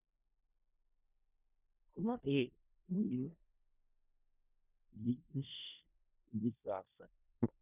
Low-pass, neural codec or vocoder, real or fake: 3.6 kHz; codec, 16 kHz in and 24 kHz out, 0.4 kbps, LongCat-Audio-Codec, four codebook decoder; fake